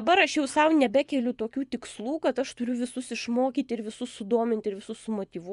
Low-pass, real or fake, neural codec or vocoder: 10.8 kHz; fake; vocoder, 24 kHz, 100 mel bands, Vocos